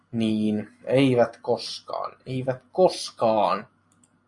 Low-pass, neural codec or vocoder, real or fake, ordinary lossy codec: 10.8 kHz; none; real; AAC, 64 kbps